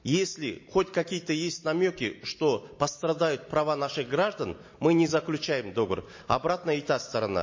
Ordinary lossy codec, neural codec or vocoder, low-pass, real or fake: MP3, 32 kbps; none; 7.2 kHz; real